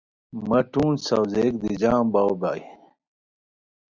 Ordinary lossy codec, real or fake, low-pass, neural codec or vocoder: Opus, 64 kbps; real; 7.2 kHz; none